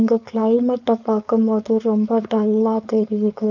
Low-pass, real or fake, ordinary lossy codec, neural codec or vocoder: 7.2 kHz; fake; none; codec, 16 kHz, 4.8 kbps, FACodec